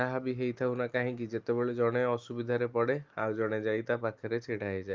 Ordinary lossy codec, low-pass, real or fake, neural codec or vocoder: Opus, 24 kbps; 7.2 kHz; real; none